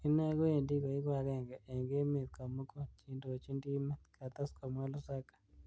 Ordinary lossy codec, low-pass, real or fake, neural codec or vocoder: none; none; real; none